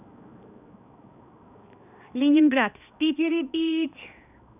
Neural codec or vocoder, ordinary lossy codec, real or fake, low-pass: codec, 16 kHz, 2 kbps, X-Codec, HuBERT features, trained on balanced general audio; none; fake; 3.6 kHz